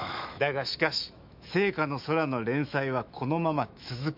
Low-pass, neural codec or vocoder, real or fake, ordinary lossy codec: 5.4 kHz; autoencoder, 48 kHz, 128 numbers a frame, DAC-VAE, trained on Japanese speech; fake; MP3, 48 kbps